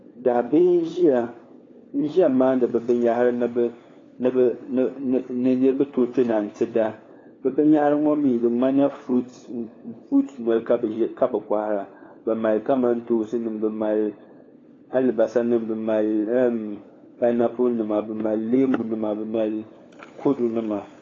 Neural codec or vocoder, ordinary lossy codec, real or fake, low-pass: codec, 16 kHz, 4 kbps, FunCodec, trained on LibriTTS, 50 frames a second; AAC, 32 kbps; fake; 7.2 kHz